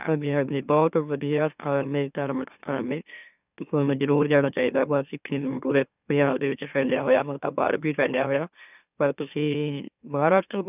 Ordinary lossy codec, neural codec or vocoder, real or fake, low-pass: none; autoencoder, 44.1 kHz, a latent of 192 numbers a frame, MeloTTS; fake; 3.6 kHz